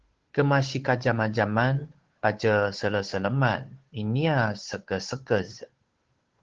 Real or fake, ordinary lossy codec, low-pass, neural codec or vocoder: fake; Opus, 16 kbps; 7.2 kHz; codec, 16 kHz, 8 kbps, FunCodec, trained on Chinese and English, 25 frames a second